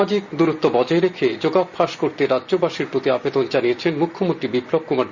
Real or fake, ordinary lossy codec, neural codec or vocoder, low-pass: real; Opus, 64 kbps; none; 7.2 kHz